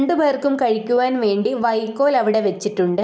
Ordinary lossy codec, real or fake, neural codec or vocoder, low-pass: none; real; none; none